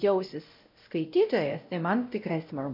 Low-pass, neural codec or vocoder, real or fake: 5.4 kHz; codec, 16 kHz, 0.5 kbps, X-Codec, WavLM features, trained on Multilingual LibriSpeech; fake